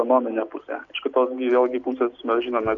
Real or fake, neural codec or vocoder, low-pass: real; none; 7.2 kHz